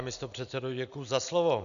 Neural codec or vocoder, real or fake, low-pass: none; real; 7.2 kHz